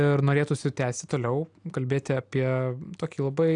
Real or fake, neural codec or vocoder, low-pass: real; none; 9.9 kHz